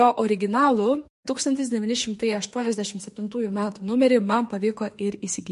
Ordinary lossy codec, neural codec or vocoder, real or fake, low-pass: MP3, 48 kbps; autoencoder, 48 kHz, 32 numbers a frame, DAC-VAE, trained on Japanese speech; fake; 14.4 kHz